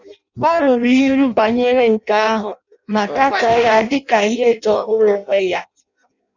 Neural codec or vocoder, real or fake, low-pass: codec, 16 kHz in and 24 kHz out, 0.6 kbps, FireRedTTS-2 codec; fake; 7.2 kHz